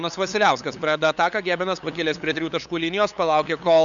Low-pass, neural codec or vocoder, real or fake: 7.2 kHz; codec, 16 kHz, 8 kbps, FunCodec, trained on LibriTTS, 25 frames a second; fake